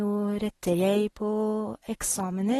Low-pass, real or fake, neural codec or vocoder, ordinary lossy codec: 19.8 kHz; real; none; AAC, 32 kbps